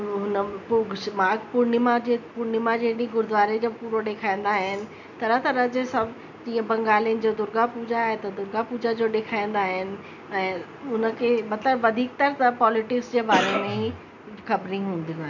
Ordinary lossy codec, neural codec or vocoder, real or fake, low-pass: none; none; real; 7.2 kHz